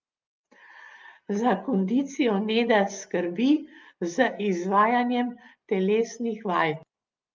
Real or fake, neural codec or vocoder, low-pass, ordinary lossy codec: real; none; 7.2 kHz; Opus, 24 kbps